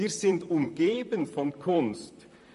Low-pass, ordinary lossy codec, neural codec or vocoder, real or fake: 14.4 kHz; MP3, 48 kbps; vocoder, 44.1 kHz, 128 mel bands, Pupu-Vocoder; fake